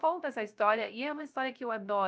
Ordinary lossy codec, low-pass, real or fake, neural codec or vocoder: none; none; fake; codec, 16 kHz, 0.3 kbps, FocalCodec